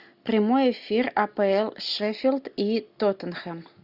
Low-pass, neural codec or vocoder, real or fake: 5.4 kHz; none; real